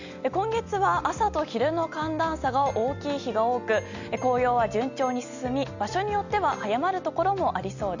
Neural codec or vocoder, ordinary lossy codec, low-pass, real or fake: none; none; 7.2 kHz; real